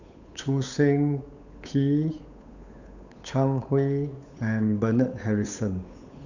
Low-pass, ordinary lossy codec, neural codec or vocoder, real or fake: 7.2 kHz; none; codec, 16 kHz, 8 kbps, FunCodec, trained on Chinese and English, 25 frames a second; fake